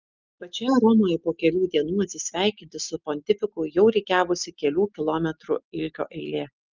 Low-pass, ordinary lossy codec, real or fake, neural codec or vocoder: 7.2 kHz; Opus, 32 kbps; real; none